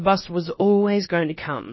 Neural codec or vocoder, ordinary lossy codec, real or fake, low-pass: codec, 16 kHz, about 1 kbps, DyCAST, with the encoder's durations; MP3, 24 kbps; fake; 7.2 kHz